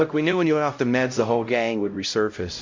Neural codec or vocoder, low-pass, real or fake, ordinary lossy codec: codec, 16 kHz, 0.5 kbps, X-Codec, HuBERT features, trained on LibriSpeech; 7.2 kHz; fake; MP3, 48 kbps